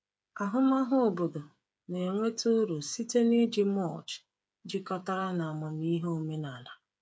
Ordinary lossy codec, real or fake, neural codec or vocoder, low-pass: none; fake; codec, 16 kHz, 8 kbps, FreqCodec, smaller model; none